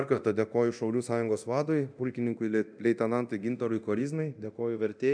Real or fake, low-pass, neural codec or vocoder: fake; 9.9 kHz; codec, 24 kHz, 0.9 kbps, DualCodec